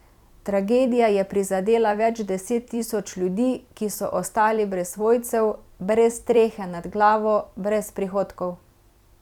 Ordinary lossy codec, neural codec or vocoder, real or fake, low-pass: none; vocoder, 44.1 kHz, 128 mel bands every 256 samples, BigVGAN v2; fake; 19.8 kHz